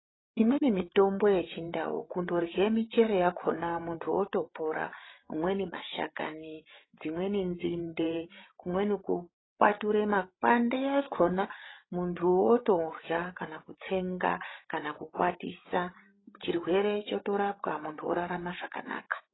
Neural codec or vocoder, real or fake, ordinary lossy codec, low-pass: codec, 16 kHz, 16 kbps, FreqCodec, larger model; fake; AAC, 16 kbps; 7.2 kHz